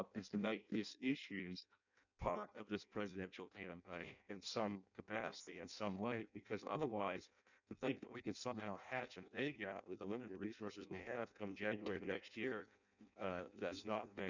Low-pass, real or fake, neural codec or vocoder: 7.2 kHz; fake; codec, 16 kHz in and 24 kHz out, 0.6 kbps, FireRedTTS-2 codec